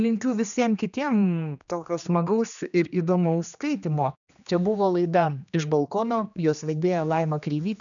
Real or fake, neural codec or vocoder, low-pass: fake; codec, 16 kHz, 2 kbps, X-Codec, HuBERT features, trained on general audio; 7.2 kHz